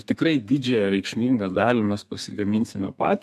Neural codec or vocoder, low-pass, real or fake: codec, 32 kHz, 1.9 kbps, SNAC; 14.4 kHz; fake